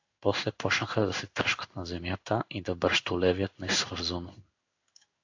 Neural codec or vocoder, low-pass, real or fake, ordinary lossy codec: codec, 16 kHz in and 24 kHz out, 1 kbps, XY-Tokenizer; 7.2 kHz; fake; AAC, 48 kbps